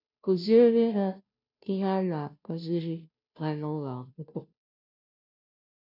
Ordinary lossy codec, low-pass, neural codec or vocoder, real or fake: none; 5.4 kHz; codec, 16 kHz, 0.5 kbps, FunCodec, trained on Chinese and English, 25 frames a second; fake